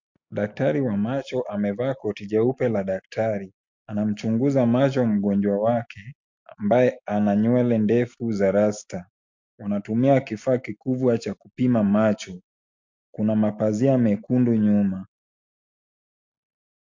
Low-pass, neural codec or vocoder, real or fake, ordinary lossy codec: 7.2 kHz; none; real; MP3, 48 kbps